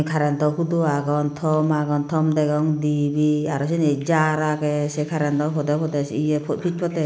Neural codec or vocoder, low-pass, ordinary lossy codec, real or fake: none; none; none; real